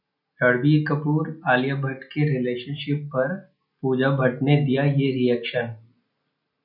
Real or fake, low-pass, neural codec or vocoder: real; 5.4 kHz; none